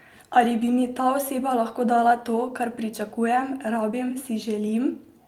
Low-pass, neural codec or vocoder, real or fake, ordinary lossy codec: 19.8 kHz; vocoder, 44.1 kHz, 128 mel bands every 256 samples, BigVGAN v2; fake; Opus, 32 kbps